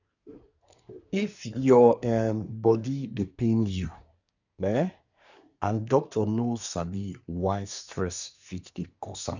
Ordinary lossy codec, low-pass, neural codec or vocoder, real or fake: none; 7.2 kHz; codec, 24 kHz, 1 kbps, SNAC; fake